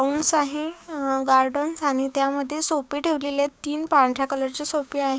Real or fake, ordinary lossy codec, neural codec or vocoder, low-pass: fake; none; codec, 16 kHz, 6 kbps, DAC; none